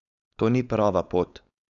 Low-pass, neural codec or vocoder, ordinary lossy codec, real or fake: 7.2 kHz; codec, 16 kHz, 4.8 kbps, FACodec; none; fake